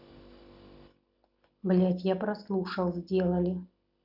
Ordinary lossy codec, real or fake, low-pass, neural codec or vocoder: none; real; 5.4 kHz; none